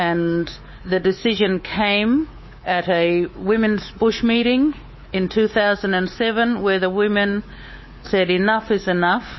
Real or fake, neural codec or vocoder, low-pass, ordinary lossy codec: fake; codec, 24 kHz, 3.1 kbps, DualCodec; 7.2 kHz; MP3, 24 kbps